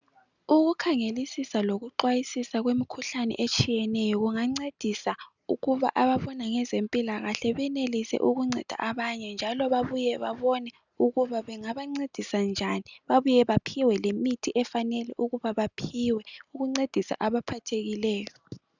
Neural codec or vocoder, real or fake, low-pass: none; real; 7.2 kHz